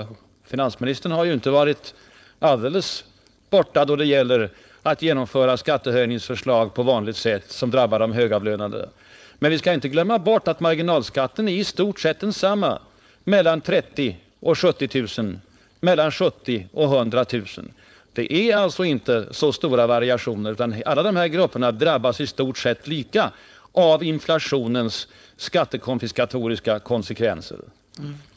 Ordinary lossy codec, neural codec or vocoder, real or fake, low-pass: none; codec, 16 kHz, 4.8 kbps, FACodec; fake; none